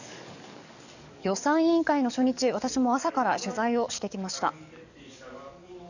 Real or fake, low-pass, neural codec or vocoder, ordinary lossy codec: fake; 7.2 kHz; codec, 44.1 kHz, 7.8 kbps, DAC; none